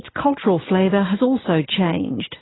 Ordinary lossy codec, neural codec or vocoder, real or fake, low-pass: AAC, 16 kbps; none; real; 7.2 kHz